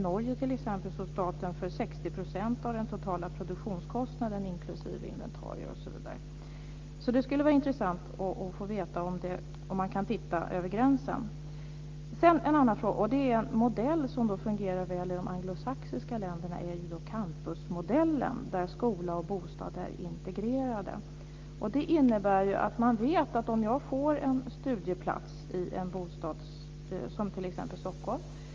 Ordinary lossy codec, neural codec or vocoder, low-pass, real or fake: Opus, 32 kbps; none; 7.2 kHz; real